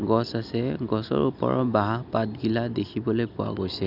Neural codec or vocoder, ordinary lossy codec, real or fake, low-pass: none; none; real; 5.4 kHz